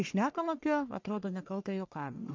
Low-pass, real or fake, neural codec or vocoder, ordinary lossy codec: 7.2 kHz; fake; codec, 44.1 kHz, 1.7 kbps, Pupu-Codec; MP3, 64 kbps